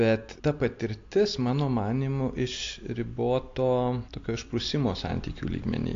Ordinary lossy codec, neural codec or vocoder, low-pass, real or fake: AAC, 48 kbps; none; 7.2 kHz; real